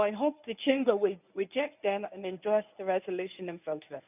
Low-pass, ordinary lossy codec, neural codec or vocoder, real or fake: 3.6 kHz; none; codec, 24 kHz, 0.9 kbps, WavTokenizer, medium speech release version 1; fake